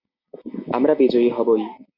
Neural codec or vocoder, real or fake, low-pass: none; real; 5.4 kHz